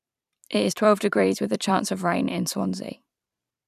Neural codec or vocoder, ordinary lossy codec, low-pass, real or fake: vocoder, 44.1 kHz, 128 mel bands every 256 samples, BigVGAN v2; none; 14.4 kHz; fake